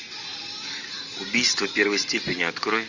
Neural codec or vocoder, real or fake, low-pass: none; real; 7.2 kHz